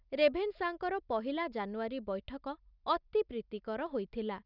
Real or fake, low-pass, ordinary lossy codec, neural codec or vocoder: real; 5.4 kHz; none; none